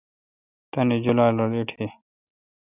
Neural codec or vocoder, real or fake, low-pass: none; real; 3.6 kHz